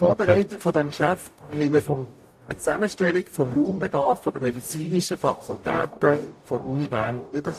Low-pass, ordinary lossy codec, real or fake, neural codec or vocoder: 14.4 kHz; AAC, 64 kbps; fake; codec, 44.1 kHz, 0.9 kbps, DAC